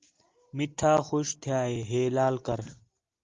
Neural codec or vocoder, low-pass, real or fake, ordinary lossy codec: none; 7.2 kHz; real; Opus, 32 kbps